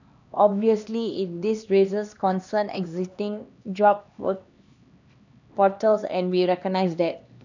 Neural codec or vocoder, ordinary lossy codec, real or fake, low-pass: codec, 16 kHz, 2 kbps, X-Codec, HuBERT features, trained on LibriSpeech; none; fake; 7.2 kHz